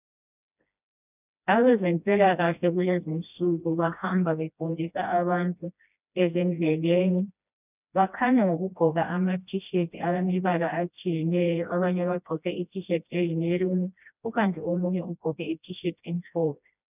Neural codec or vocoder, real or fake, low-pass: codec, 16 kHz, 1 kbps, FreqCodec, smaller model; fake; 3.6 kHz